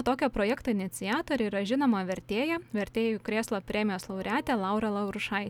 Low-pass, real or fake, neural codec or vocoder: 19.8 kHz; real; none